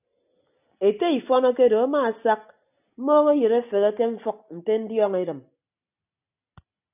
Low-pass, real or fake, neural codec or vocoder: 3.6 kHz; real; none